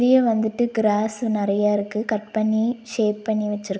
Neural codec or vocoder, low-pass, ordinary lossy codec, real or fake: none; none; none; real